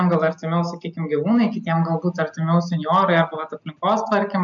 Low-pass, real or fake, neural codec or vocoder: 7.2 kHz; real; none